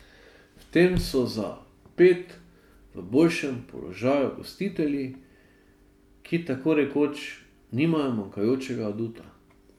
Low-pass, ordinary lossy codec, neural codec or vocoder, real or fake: 19.8 kHz; MP3, 96 kbps; none; real